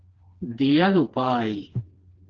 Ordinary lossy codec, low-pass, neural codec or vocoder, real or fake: Opus, 16 kbps; 7.2 kHz; codec, 16 kHz, 2 kbps, FreqCodec, smaller model; fake